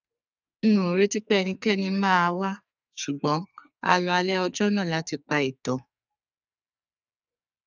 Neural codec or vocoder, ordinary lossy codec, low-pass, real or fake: codec, 44.1 kHz, 2.6 kbps, SNAC; none; 7.2 kHz; fake